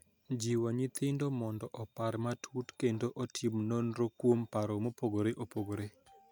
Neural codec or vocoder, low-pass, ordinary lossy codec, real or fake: none; none; none; real